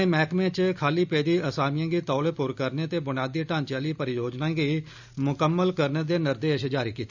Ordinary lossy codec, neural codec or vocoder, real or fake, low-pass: none; none; real; 7.2 kHz